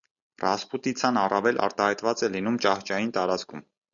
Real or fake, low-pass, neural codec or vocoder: real; 7.2 kHz; none